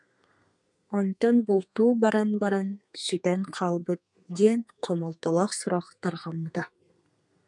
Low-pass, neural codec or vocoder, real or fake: 10.8 kHz; codec, 32 kHz, 1.9 kbps, SNAC; fake